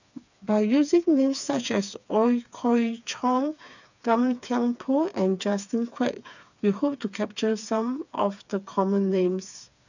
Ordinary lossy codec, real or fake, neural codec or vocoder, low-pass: none; fake; codec, 16 kHz, 4 kbps, FreqCodec, smaller model; 7.2 kHz